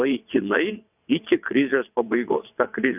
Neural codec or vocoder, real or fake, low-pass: vocoder, 22.05 kHz, 80 mel bands, WaveNeXt; fake; 3.6 kHz